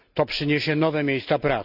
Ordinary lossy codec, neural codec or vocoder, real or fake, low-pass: none; none; real; 5.4 kHz